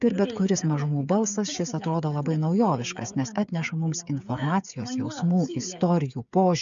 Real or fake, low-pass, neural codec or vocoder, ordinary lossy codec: fake; 7.2 kHz; codec, 16 kHz, 8 kbps, FreqCodec, smaller model; MP3, 96 kbps